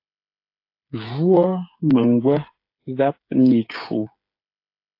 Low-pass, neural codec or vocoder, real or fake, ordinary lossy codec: 5.4 kHz; codec, 16 kHz, 8 kbps, FreqCodec, smaller model; fake; AAC, 32 kbps